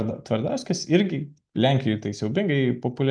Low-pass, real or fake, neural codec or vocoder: 9.9 kHz; real; none